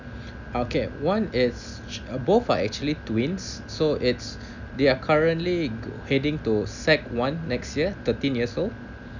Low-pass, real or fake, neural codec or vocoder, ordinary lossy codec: 7.2 kHz; real; none; none